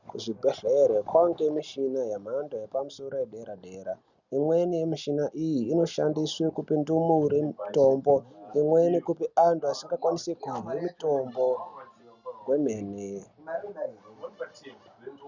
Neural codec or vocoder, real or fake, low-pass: none; real; 7.2 kHz